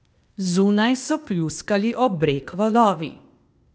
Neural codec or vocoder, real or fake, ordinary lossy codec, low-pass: codec, 16 kHz, 0.8 kbps, ZipCodec; fake; none; none